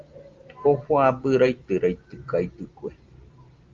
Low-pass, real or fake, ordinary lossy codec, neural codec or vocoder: 7.2 kHz; real; Opus, 32 kbps; none